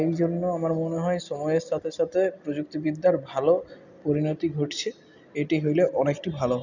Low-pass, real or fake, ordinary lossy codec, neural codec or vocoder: 7.2 kHz; real; none; none